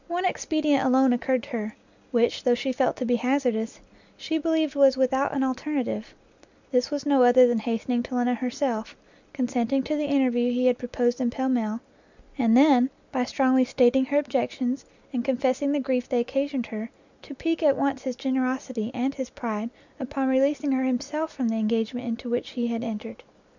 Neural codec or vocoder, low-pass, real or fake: none; 7.2 kHz; real